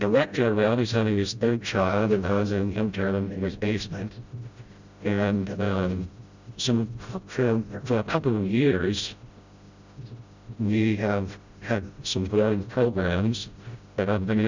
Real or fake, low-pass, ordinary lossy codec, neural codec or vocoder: fake; 7.2 kHz; Opus, 64 kbps; codec, 16 kHz, 0.5 kbps, FreqCodec, smaller model